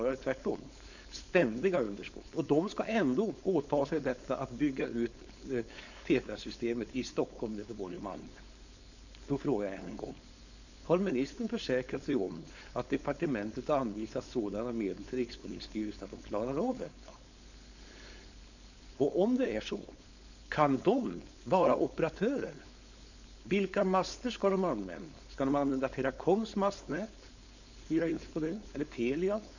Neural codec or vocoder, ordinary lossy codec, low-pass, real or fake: codec, 16 kHz, 4.8 kbps, FACodec; none; 7.2 kHz; fake